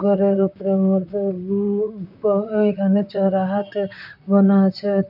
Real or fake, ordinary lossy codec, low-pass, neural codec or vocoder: fake; none; 5.4 kHz; vocoder, 44.1 kHz, 128 mel bands, Pupu-Vocoder